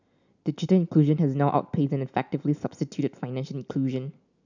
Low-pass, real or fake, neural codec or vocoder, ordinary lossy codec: 7.2 kHz; real; none; none